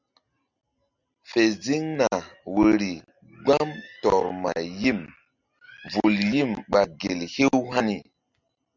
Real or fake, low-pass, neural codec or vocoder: real; 7.2 kHz; none